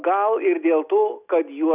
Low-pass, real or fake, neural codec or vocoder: 3.6 kHz; real; none